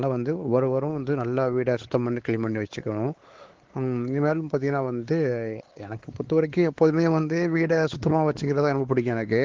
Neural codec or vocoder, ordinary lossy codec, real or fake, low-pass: codec, 16 kHz, 4 kbps, X-Codec, WavLM features, trained on Multilingual LibriSpeech; Opus, 16 kbps; fake; 7.2 kHz